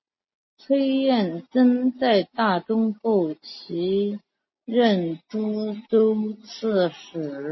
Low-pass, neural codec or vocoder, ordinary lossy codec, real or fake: 7.2 kHz; none; MP3, 24 kbps; real